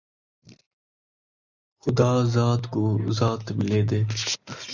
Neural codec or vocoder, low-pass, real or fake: none; 7.2 kHz; real